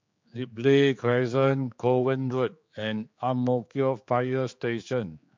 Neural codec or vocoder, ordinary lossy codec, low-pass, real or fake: codec, 16 kHz, 4 kbps, X-Codec, HuBERT features, trained on general audio; MP3, 48 kbps; 7.2 kHz; fake